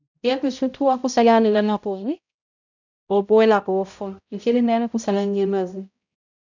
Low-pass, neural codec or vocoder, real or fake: 7.2 kHz; codec, 16 kHz, 0.5 kbps, X-Codec, HuBERT features, trained on balanced general audio; fake